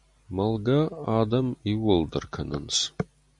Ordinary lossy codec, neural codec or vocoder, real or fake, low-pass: MP3, 96 kbps; none; real; 10.8 kHz